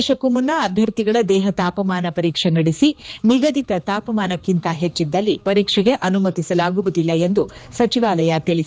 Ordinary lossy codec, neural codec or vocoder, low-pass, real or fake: none; codec, 16 kHz, 2 kbps, X-Codec, HuBERT features, trained on general audio; none; fake